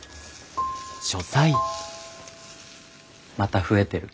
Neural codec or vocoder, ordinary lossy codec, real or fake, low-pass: none; none; real; none